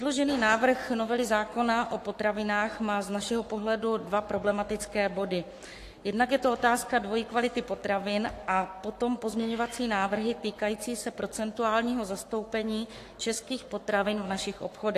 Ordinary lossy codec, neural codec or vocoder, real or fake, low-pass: AAC, 64 kbps; codec, 44.1 kHz, 7.8 kbps, Pupu-Codec; fake; 14.4 kHz